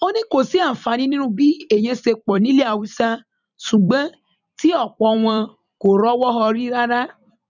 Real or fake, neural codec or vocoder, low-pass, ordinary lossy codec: fake; vocoder, 44.1 kHz, 128 mel bands every 256 samples, BigVGAN v2; 7.2 kHz; none